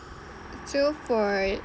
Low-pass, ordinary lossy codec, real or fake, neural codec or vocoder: none; none; real; none